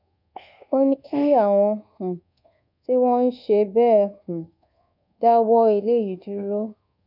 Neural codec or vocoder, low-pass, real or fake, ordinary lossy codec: codec, 24 kHz, 1.2 kbps, DualCodec; 5.4 kHz; fake; none